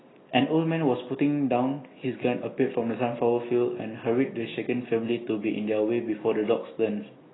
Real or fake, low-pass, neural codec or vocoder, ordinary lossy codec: real; 7.2 kHz; none; AAC, 16 kbps